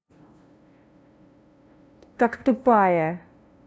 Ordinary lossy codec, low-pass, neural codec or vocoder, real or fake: none; none; codec, 16 kHz, 0.5 kbps, FunCodec, trained on LibriTTS, 25 frames a second; fake